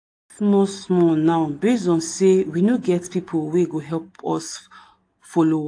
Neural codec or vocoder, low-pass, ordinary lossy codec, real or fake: none; 9.9 kHz; AAC, 48 kbps; real